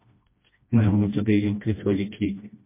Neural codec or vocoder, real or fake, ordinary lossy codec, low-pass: codec, 16 kHz, 1 kbps, FreqCodec, smaller model; fake; MP3, 24 kbps; 3.6 kHz